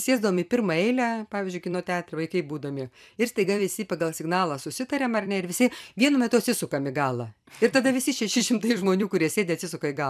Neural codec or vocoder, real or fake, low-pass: vocoder, 44.1 kHz, 128 mel bands every 256 samples, BigVGAN v2; fake; 14.4 kHz